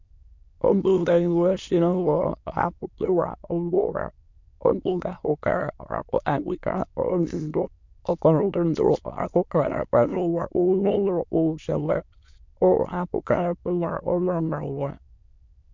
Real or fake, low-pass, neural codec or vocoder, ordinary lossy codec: fake; 7.2 kHz; autoencoder, 22.05 kHz, a latent of 192 numbers a frame, VITS, trained on many speakers; MP3, 64 kbps